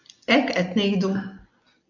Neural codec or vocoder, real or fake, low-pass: none; real; 7.2 kHz